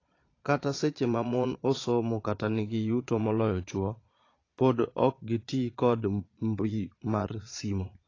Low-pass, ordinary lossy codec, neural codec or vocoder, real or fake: 7.2 kHz; AAC, 32 kbps; vocoder, 22.05 kHz, 80 mel bands, Vocos; fake